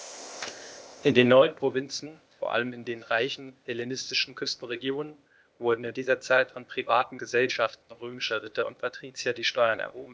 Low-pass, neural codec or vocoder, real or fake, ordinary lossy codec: none; codec, 16 kHz, 0.8 kbps, ZipCodec; fake; none